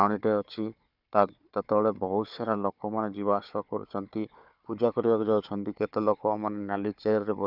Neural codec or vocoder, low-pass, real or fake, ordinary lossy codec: codec, 16 kHz, 4 kbps, FunCodec, trained on Chinese and English, 50 frames a second; 5.4 kHz; fake; none